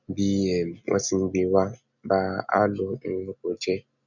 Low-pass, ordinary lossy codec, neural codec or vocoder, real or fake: 7.2 kHz; none; none; real